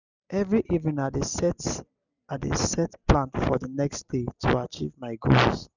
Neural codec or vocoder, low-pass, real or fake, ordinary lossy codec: none; 7.2 kHz; real; none